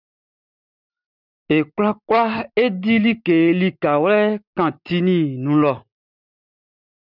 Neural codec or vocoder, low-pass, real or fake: none; 5.4 kHz; real